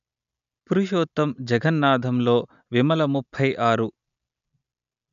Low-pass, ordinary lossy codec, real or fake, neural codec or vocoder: 7.2 kHz; none; real; none